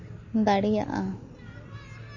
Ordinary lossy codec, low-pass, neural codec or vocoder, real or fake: MP3, 32 kbps; 7.2 kHz; none; real